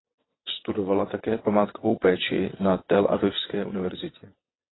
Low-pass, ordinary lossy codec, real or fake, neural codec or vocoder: 7.2 kHz; AAC, 16 kbps; fake; vocoder, 22.05 kHz, 80 mel bands, WaveNeXt